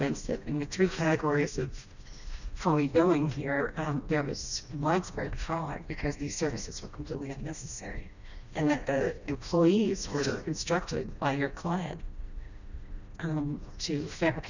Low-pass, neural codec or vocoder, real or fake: 7.2 kHz; codec, 16 kHz, 1 kbps, FreqCodec, smaller model; fake